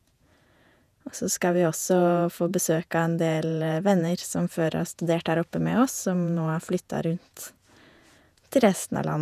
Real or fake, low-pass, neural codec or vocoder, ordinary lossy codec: fake; 14.4 kHz; vocoder, 48 kHz, 128 mel bands, Vocos; none